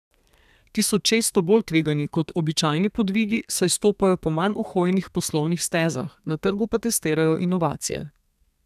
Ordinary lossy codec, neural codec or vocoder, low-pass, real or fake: none; codec, 32 kHz, 1.9 kbps, SNAC; 14.4 kHz; fake